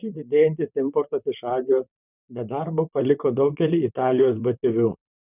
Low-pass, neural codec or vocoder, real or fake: 3.6 kHz; vocoder, 44.1 kHz, 128 mel bands, Pupu-Vocoder; fake